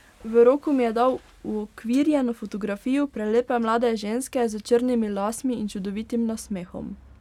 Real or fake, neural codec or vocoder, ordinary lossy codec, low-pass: real; none; none; 19.8 kHz